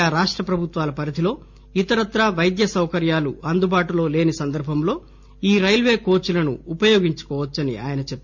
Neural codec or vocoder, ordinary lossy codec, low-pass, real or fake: none; MP3, 64 kbps; 7.2 kHz; real